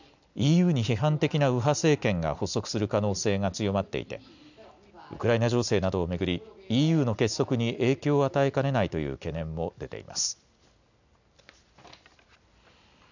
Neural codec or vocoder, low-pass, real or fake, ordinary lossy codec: none; 7.2 kHz; real; none